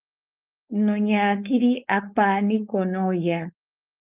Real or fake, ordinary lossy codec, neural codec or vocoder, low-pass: fake; Opus, 24 kbps; codec, 16 kHz, 4.8 kbps, FACodec; 3.6 kHz